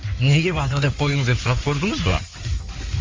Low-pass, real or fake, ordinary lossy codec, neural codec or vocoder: 7.2 kHz; fake; Opus, 32 kbps; codec, 16 kHz in and 24 kHz out, 2.2 kbps, FireRedTTS-2 codec